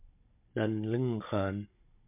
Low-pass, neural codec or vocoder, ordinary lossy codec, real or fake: 3.6 kHz; codec, 16 kHz, 16 kbps, FunCodec, trained on Chinese and English, 50 frames a second; MP3, 32 kbps; fake